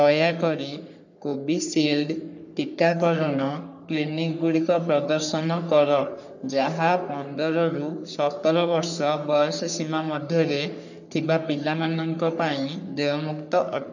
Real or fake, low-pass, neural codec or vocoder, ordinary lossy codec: fake; 7.2 kHz; codec, 44.1 kHz, 3.4 kbps, Pupu-Codec; none